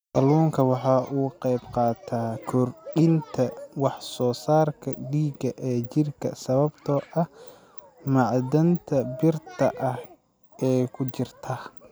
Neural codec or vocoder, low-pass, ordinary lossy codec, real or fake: none; none; none; real